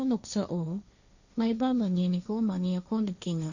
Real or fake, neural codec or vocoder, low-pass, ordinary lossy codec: fake; codec, 16 kHz, 1.1 kbps, Voila-Tokenizer; 7.2 kHz; none